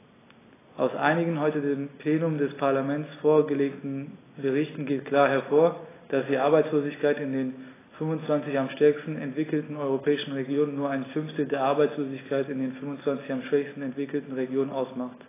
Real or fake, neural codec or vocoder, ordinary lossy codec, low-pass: real; none; AAC, 16 kbps; 3.6 kHz